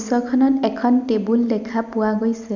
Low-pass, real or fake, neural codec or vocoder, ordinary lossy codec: 7.2 kHz; real; none; none